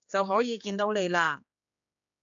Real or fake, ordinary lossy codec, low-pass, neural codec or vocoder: fake; AAC, 64 kbps; 7.2 kHz; codec, 16 kHz, 2 kbps, X-Codec, HuBERT features, trained on general audio